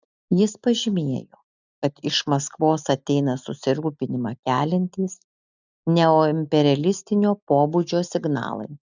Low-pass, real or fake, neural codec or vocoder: 7.2 kHz; real; none